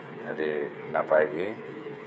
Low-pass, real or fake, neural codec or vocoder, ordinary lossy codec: none; fake; codec, 16 kHz, 8 kbps, FreqCodec, smaller model; none